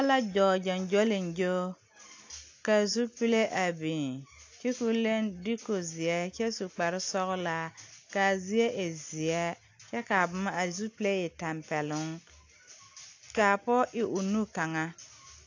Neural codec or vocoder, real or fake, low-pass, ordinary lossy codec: none; real; 7.2 kHz; AAC, 48 kbps